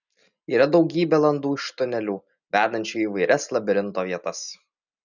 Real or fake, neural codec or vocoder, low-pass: real; none; 7.2 kHz